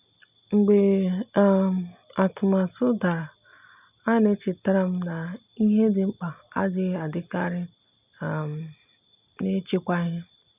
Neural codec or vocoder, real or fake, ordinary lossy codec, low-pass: none; real; none; 3.6 kHz